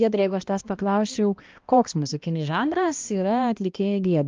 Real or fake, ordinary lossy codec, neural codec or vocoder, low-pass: fake; Opus, 24 kbps; codec, 16 kHz, 1 kbps, X-Codec, HuBERT features, trained on balanced general audio; 7.2 kHz